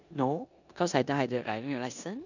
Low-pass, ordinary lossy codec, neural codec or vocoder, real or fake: 7.2 kHz; none; codec, 16 kHz in and 24 kHz out, 0.9 kbps, LongCat-Audio-Codec, four codebook decoder; fake